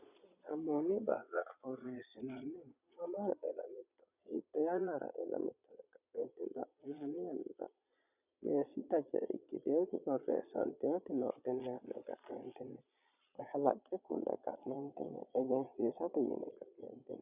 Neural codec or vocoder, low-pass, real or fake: vocoder, 22.05 kHz, 80 mel bands, Vocos; 3.6 kHz; fake